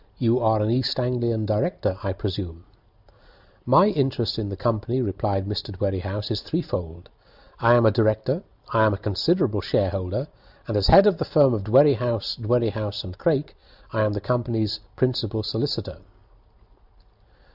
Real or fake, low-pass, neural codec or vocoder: real; 5.4 kHz; none